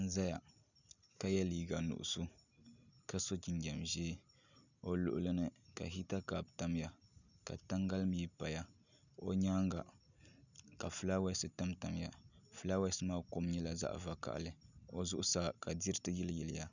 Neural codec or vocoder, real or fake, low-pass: none; real; 7.2 kHz